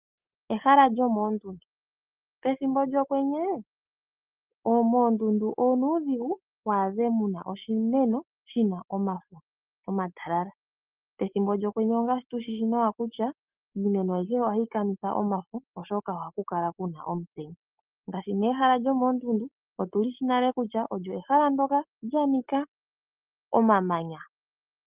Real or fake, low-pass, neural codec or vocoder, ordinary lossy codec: real; 3.6 kHz; none; Opus, 32 kbps